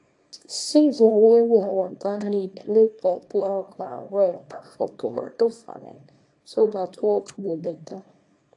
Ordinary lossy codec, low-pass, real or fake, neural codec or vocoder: AAC, 48 kbps; 10.8 kHz; fake; codec, 24 kHz, 0.9 kbps, WavTokenizer, small release